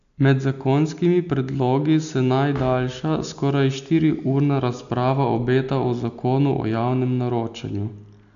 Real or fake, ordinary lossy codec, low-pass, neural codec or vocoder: real; AAC, 96 kbps; 7.2 kHz; none